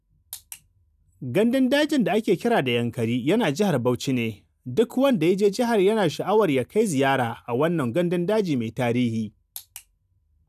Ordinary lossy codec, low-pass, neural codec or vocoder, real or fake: none; 14.4 kHz; none; real